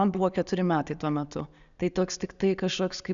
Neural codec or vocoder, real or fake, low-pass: none; real; 7.2 kHz